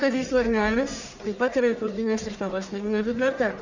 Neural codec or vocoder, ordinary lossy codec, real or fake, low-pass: codec, 44.1 kHz, 1.7 kbps, Pupu-Codec; Opus, 64 kbps; fake; 7.2 kHz